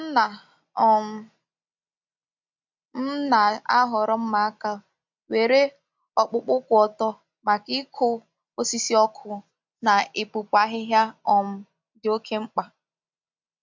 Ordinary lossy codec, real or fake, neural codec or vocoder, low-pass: MP3, 64 kbps; real; none; 7.2 kHz